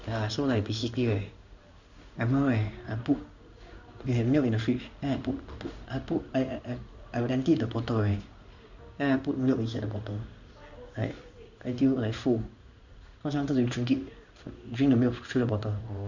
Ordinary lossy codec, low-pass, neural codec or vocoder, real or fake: none; 7.2 kHz; codec, 16 kHz in and 24 kHz out, 1 kbps, XY-Tokenizer; fake